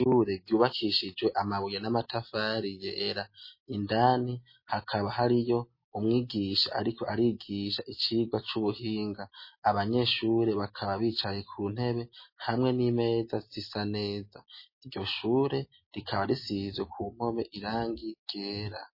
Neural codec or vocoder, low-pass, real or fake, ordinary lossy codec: none; 5.4 kHz; real; MP3, 24 kbps